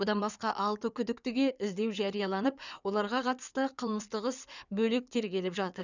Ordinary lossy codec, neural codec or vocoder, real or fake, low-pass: none; codec, 16 kHz in and 24 kHz out, 2.2 kbps, FireRedTTS-2 codec; fake; 7.2 kHz